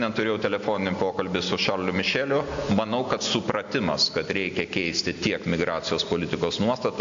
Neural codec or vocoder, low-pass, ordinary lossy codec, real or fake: none; 7.2 kHz; AAC, 64 kbps; real